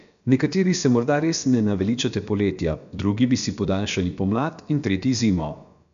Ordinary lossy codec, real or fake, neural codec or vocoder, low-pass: none; fake; codec, 16 kHz, about 1 kbps, DyCAST, with the encoder's durations; 7.2 kHz